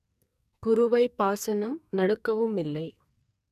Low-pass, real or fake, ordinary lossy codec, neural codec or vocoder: 14.4 kHz; fake; none; codec, 44.1 kHz, 2.6 kbps, SNAC